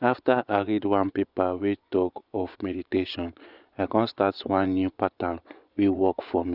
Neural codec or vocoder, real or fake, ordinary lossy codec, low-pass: vocoder, 24 kHz, 100 mel bands, Vocos; fake; none; 5.4 kHz